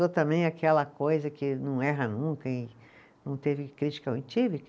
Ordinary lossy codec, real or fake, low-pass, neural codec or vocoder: none; real; none; none